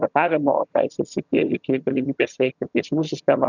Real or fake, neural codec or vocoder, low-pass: fake; vocoder, 22.05 kHz, 80 mel bands, HiFi-GAN; 7.2 kHz